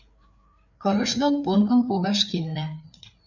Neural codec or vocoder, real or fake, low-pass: codec, 16 kHz, 4 kbps, FreqCodec, larger model; fake; 7.2 kHz